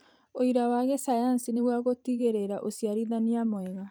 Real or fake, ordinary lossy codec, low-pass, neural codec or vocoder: fake; none; none; vocoder, 44.1 kHz, 128 mel bands every 512 samples, BigVGAN v2